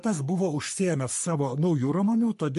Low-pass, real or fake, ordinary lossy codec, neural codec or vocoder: 14.4 kHz; fake; MP3, 48 kbps; codec, 44.1 kHz, 3.4 kbps, Pupu-Codec